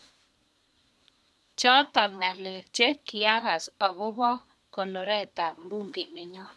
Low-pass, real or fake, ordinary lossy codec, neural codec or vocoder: none; fake; none; codec, 24 kHz, 1 kbps, SNAC